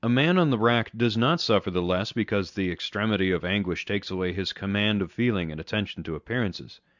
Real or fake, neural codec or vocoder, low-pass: real; none; 7.2 kHz